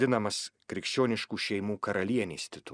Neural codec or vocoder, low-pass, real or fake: none; 9.9 kHz; real